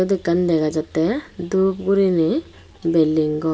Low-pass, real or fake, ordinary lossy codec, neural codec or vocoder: none; real; none; none